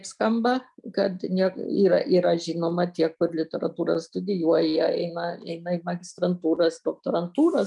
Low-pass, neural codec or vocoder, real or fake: 10.8 kHz; none; real